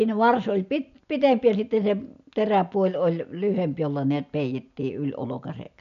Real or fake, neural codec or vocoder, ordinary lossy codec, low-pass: real; none; none; 7.2 kHz